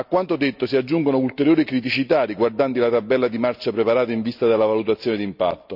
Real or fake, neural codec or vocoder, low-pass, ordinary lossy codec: real; none; 5.4 kHz; none